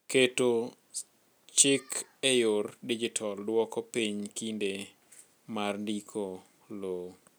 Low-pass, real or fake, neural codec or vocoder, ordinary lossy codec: none; real; none; none